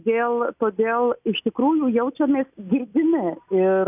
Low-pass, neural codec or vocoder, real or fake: 3.6 kHz; none; real